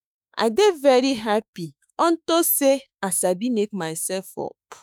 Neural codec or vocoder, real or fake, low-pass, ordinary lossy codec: autoencoder, 48 kHz, 32 numbers a frame, DAC-VAE, trained on Japanese speech; fake; none; none